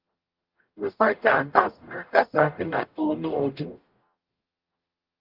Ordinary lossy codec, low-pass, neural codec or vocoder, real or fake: Opus, 32 kbps; 5.4 kHz; codec, 44.1 kHz, 0.9 kbps, DAC; fake